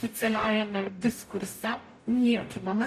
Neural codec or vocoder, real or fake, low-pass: codec, 44.1 kHz, 0.9 kbps, DAC; fake; 14.4 kHz